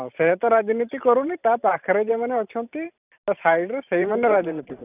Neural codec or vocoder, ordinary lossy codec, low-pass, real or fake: vocoder, 44.1 kHz, 128 mel bands every 512 samples, BigVGAN v2; none; 3.6 kHz; fake